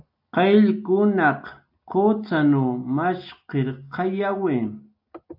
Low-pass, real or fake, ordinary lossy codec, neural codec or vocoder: 5.4 kHz; real; AAC, 48 kbps; none